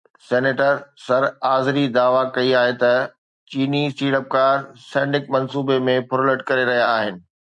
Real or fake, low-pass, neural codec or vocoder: real; 10.8 kHz; none